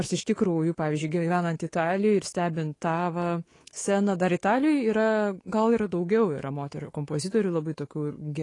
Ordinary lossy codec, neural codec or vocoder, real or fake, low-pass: AAC, 32 kbps; codec, 24 kHz, 3.1 kbps, DualCodec; fake; 10.8 kHz